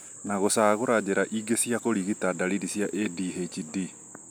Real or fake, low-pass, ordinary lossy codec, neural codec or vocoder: real; none; none; none